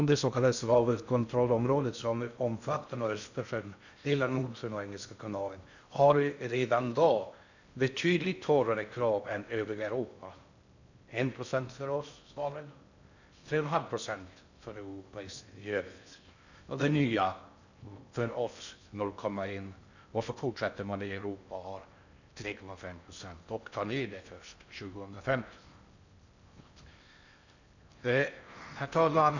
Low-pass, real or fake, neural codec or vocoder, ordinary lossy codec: 7.2 kHz; fake; codec, 16 kHz in and 24 kHz out, 0.6 kbps, FocalCodec, streaming, 2048 codes; none